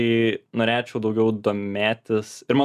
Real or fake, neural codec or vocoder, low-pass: real; none; 14.4 kHz